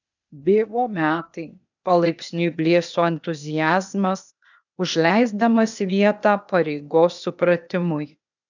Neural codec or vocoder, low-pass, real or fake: codec, 16 kHz, 0.8 kbps, ZipCodec; 7.2 kHz; fake